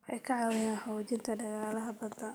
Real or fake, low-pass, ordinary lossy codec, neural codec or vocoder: fake; none; none; codec, 44.1 kHz, 7.8 kbps, DAC